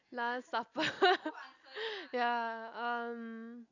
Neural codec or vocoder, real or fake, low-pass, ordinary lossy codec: none; real; 7.2 kHz; none